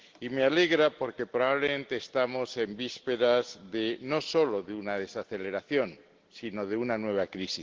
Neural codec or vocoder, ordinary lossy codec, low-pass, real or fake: none; Opus, 16 kbps; 7.2 kHz; real